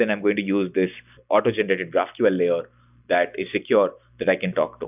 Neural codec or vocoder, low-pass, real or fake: none; 3.6 kHz; real